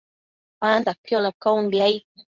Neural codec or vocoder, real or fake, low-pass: codec, 24 kHz, 0.9 kbps, WavTokenizer, medium speech release version 2; fake; 7.2 kHz